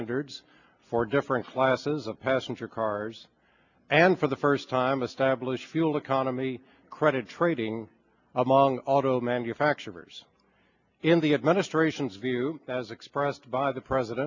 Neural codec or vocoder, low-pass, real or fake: none; 7.2 kHz; real